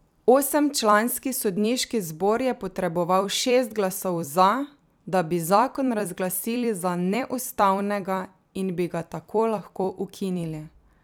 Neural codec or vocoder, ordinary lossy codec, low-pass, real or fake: vocoder, 44.1 kHz, 128 mel bands every 256 samples, BigVGAN v2; none; none; fake